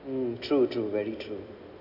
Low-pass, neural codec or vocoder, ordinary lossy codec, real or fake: 5.4 kHz; none; none; real